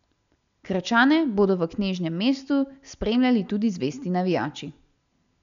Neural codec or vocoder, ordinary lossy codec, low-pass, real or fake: none; none; 7.2 kHz; real